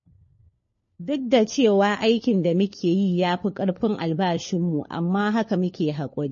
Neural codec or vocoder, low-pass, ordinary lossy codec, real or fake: codec, 16 kHz, 4 kbps, FunCodec, trained on LibriTTS, 50 frames a second; 7.2 kHz; MP3, 32 kbps; fake